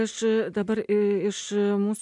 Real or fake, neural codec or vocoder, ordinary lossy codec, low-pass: real; none; MP3, 96 kbps; 10.8 kHz